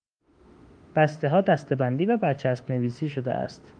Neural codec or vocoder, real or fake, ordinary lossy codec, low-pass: autoencoder, 48 kHz, 32 numbers a frame, DAC-VAE, trained on Japanese speech; fake; Opus, 32 kbps; 9.9 kHz